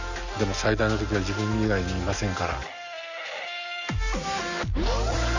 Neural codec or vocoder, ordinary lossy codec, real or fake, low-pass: none; none; real; 7.2 kHz